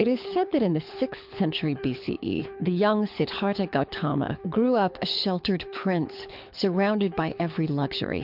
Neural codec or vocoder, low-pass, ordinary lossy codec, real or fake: codec, 24 kHz, 6 kbps, HILCodec; 5.4 kHz; MP3, 48 kbps; fake